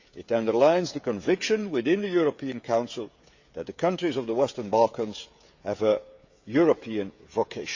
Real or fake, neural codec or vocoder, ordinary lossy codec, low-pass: fake; codec, 16 kHz, 16 kbps, FreqCodec, smaller model; Opus, 64 kbps; 7.2 kHz